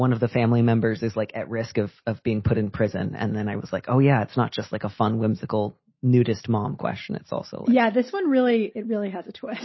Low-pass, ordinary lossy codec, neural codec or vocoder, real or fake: 7.2 kHz; MP3, 24 kbps; none; real